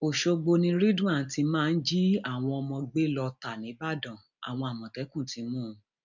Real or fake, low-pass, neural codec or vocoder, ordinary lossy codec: real; 7.2 kHz; none; none